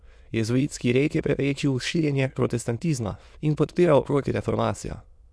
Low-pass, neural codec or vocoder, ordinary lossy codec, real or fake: none; autoencoder, 22.05 kHz, a latent of 192 numbers a frame, VITS, trained on many speakers; none; fake